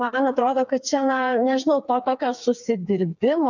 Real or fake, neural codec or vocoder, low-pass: fake; codec, 16 kHz, 4 kbps, FreqCodec, smaller model; 7.2 kHz